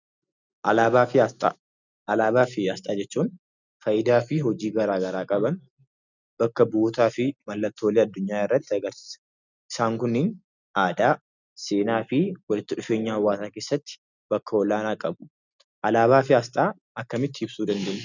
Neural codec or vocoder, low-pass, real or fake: none; 7.2 kHz; real